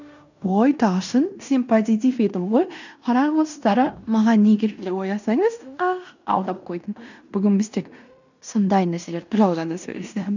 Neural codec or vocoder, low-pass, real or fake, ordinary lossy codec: codec, 16 kHz in and 24 kHz out, 0.9 kbps, LongCat-Audio-Codec, fine tuned four codebook decoder; 7.2 kHz; fake; none